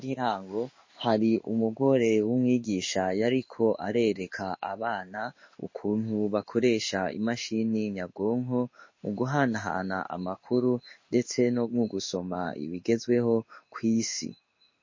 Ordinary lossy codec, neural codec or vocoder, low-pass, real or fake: MP3, 32 kbps; codec, 16 kHz in and 24 kHz out, 1 kbps, XY-Tokenizer; 7.2 kHz; fake